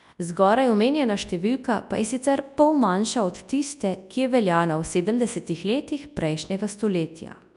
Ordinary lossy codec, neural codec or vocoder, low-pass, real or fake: none; codec, 24 kHz, 0.9 kbps, WavTokenizer, large speech release; 10.8 kHz; fake